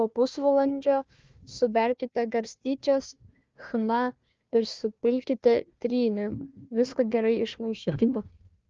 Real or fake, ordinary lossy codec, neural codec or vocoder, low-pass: fake; Opus, 24 kbps; codec, 16 kHz, 1 kbps, FunCodec, trained on Chinese and English, 50 frames a second; 7.2 kHz